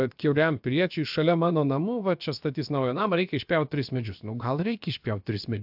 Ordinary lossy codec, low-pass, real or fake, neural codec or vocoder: AAC, 48 kbps; 5.4 kHz; fake; codec, 16 kHz, about 1 kbps, DyCAST, with the encoder's durations